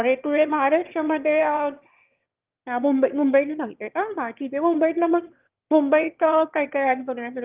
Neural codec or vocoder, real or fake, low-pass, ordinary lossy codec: autoencoder, 22.05 kHz, a latent of 192 numbers a frame, VITS, trained on one speaker; fake; 3.6 kHz; Opus, 32 kbps